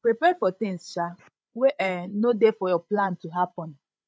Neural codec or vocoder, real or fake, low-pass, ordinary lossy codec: codec, 16 kHz, 16 kbps, FreqCodec, larger model; fake; none; none